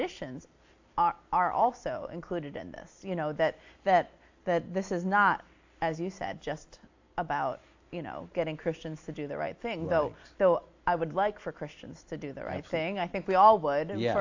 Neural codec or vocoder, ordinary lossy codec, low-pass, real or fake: none; AAC, 48 kbps; 7.2 kHz; real